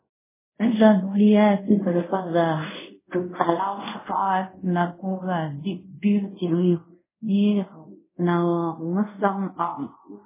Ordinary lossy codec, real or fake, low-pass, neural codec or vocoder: MP3, 16 kbps; fake; 3.6 kHz; codec, 24 kHz, 0.5 kbps, DualCodec